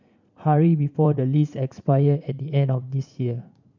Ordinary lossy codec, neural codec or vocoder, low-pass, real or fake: none; vocoder, 44.1 kHz, 80 mel bands, Vocos; 7.2 kHz; fake